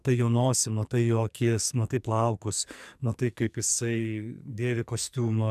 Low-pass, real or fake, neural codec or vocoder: 14.4 kHz; fake; codec, 44.1 kHz, 2.6 kbps, SNAC